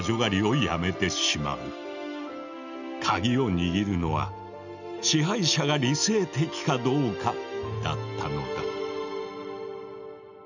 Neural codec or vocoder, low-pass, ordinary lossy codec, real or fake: none; 7.2 kHz; none; real